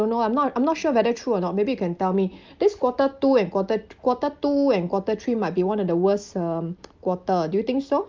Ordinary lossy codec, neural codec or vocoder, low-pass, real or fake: Opus, 24 kbps; none; 7.2 kHz; real